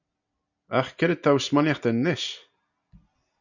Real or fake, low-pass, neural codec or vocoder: real; 7.2 kHz; none